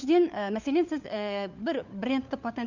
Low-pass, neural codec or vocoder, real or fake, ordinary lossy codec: 7.2 kHz; codec, 16 kHz, 4 kbps, FunCodec, trained on LibriTTS, 50 frames a second; fake; Opus, 64 kbps